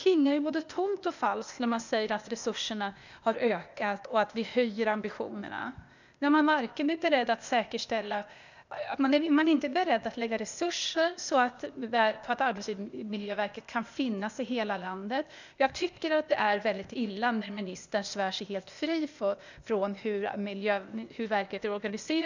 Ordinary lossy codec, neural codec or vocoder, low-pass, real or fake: none; codec, 16 kHz, 0.8 kbps, ZipCodec; 7.2 kHz; fake